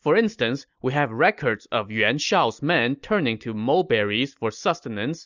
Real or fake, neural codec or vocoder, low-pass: real; none; 7.2 kHz